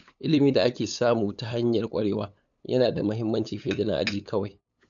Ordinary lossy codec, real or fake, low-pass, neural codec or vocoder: none; fake; 7.2 kHz; codec, 16 kHz, 8 kbps, FunCodec, trained on LibriTTS, 25 frames a second